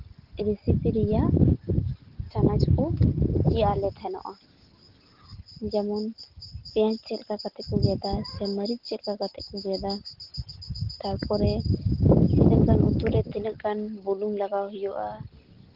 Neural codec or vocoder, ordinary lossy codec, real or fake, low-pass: none; Opus, 16 kbps; real; 5.4 kHz